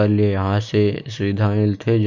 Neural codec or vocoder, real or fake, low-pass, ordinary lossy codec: none; real; 7.2 kHz; none